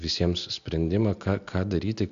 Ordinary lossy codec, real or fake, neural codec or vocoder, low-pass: AAC, 96 kbps; real; none; 7.2 kHz